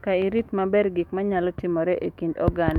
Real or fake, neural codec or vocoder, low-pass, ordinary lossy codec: fake; autoencoder, 48 kHz, 128 numbers a frame, DAC-VAE, trained on Japanese speech; 19.8 kHz; none